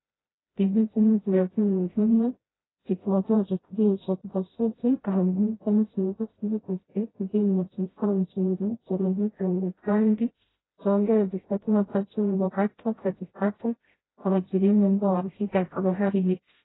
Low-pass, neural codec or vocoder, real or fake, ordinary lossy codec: 7.2 kHz; codec, 16 kHz, 0.5 kbps, FreqCodec, smaller model; fake; AAC, 16 kbps